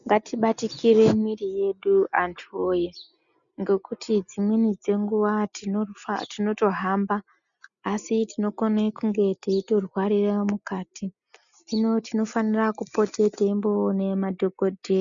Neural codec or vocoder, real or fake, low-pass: none; real; 7.2 kHz